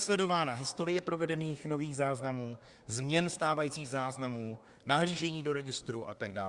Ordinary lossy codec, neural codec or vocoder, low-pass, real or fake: Opus, 64 kbps; codec, 24 kHz, 1 kbps, SNAC; 10.8 kHz; fake